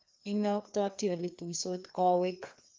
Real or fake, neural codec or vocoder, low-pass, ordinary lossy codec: fake; codec, 16 kHz, 2 kbps, FreqCodec, larger model; 7.2 kHz; Opus, 24 kbps